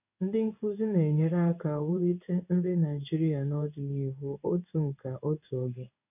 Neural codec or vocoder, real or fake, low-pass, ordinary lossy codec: codec, 16 kHz in and 24 kHz out, 1 kbps, XY-Tokenizer; fake; 3.6 kHz; none